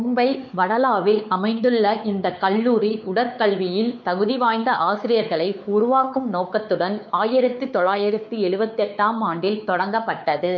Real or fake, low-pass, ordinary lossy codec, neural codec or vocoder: fake; 7.2 kHz; none; codec, 16 kHz, 4 kbps, X-Codec, WavLM features, trained on Multilingual LibriSpeech